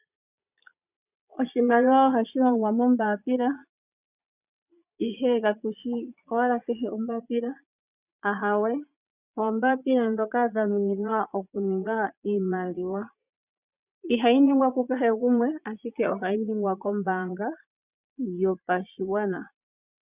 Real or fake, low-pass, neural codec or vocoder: fake; 3.6 kHz; vocoder, 22.05 kHz, 80 mel bands, Vocos